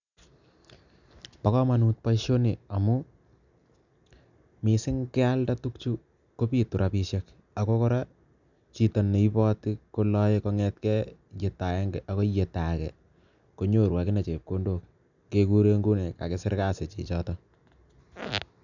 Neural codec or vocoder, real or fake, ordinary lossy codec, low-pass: none; real; none; 7.2 kHz